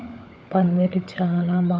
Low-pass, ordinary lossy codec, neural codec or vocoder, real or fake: none; none; codec, 16 kHz, 16 kbps, FunCodec, trained on LibriTTS, 50 frames a second; fake